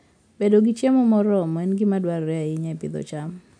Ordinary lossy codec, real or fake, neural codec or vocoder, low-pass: MP3, 96 kbps; real; none; 9.9 kHz